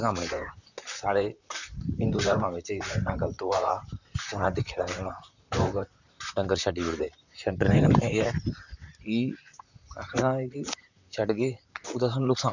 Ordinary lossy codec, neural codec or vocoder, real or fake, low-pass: none; vocoder, 44.1 kHz, 128 mel bands, Pupu-Vocoder; fake; 7.2 kHz